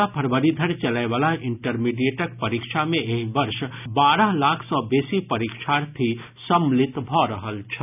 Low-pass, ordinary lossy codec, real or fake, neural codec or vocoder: 3.6 kHz; none; real; none